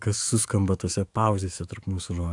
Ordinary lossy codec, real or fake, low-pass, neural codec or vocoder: AAC, 64 kbps; fake; 10.8 kHz; autoencoder, 48 kHz, 128 numbers a frame, DAC-VAE, trained on Japanese speech